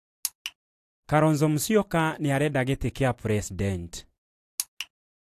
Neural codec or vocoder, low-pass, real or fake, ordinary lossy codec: vocoder, 44.1 kHz, 128 mel bands every 256 samples, BigVGAN v2; 14.4 kHz; fake; AAC, 64 kbps